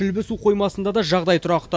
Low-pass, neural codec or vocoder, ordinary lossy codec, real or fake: none; none; none; real